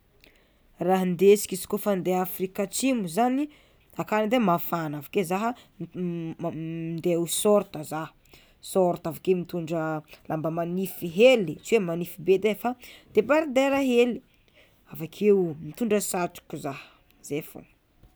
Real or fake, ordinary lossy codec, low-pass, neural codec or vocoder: real; none; none; none